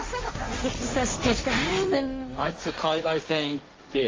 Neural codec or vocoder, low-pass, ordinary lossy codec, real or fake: codec, 16 kHz, 1.1 kbps, Voila-Tokenizer; 7.2 kHz; Opus, 32 kbps; fake